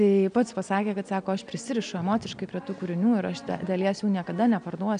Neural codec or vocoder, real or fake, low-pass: none; real; 9.9 kHz